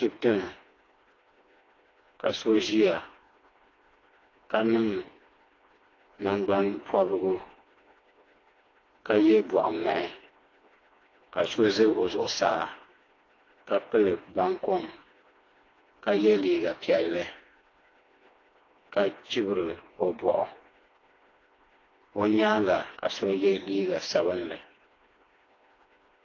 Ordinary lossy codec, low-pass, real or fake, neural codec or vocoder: AAC, 32 kbps; 7.2 kHz; fake; codec, 16 kHz, 2 kbps, FreqCodec, smaller model